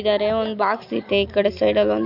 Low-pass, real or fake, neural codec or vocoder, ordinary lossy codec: 5.4 kHz; real; none; none